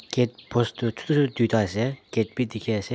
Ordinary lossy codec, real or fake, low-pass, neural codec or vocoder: none; real; none; none